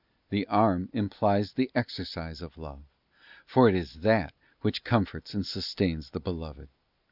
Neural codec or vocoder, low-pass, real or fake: none; 5.4 kHz; real